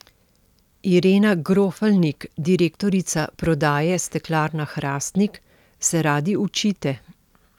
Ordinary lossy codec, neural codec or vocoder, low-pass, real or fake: none; none; 19.8 kHz; real